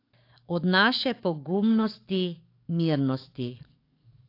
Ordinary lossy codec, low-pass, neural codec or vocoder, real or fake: AAC, 32 kbps; 5.4 kHz; codec, 44.1 kHz, 7.8 kbps, DAC; fake